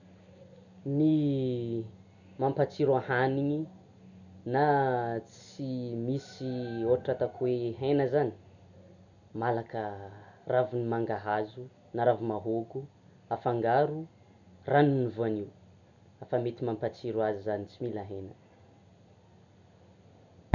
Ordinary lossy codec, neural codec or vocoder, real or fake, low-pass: none; none; real; 7.2 kHz